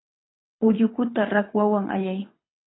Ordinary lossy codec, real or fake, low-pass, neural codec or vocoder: AAC, 16 kbps; fake; 7.2 kHz; codec, 24 kHz, 6 kbps, HILCodec